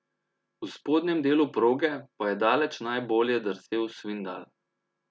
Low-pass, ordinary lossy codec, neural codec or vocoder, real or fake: none; none; none; real